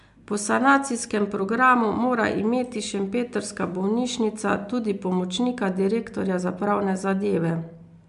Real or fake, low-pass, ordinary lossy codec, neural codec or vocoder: real; 10.8 kHz; MP3, 64 kbps; none